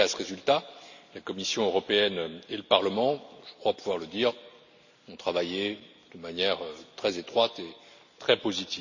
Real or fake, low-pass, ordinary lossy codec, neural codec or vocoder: real; 7.2 kHz; none; none